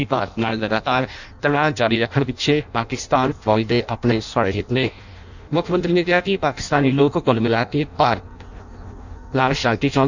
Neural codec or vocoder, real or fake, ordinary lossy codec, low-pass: codec, 16 kHz in and 24 kHz out, 0.6 kbps, FireRedTTS-2 codec; fake; none; 7.2 kHz